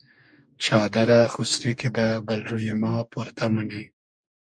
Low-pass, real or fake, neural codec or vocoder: 9.9 kHz; fake; codec, 44.1 kHz, 2.6 kbps, DAC